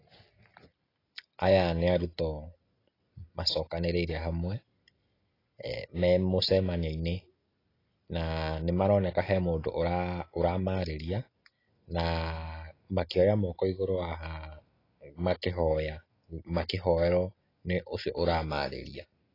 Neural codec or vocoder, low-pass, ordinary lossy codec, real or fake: none; 5.4 kHz; AAC, 24 kbps; real